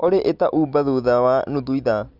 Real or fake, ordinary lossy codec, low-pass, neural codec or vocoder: real; none; 5.4 kHz; none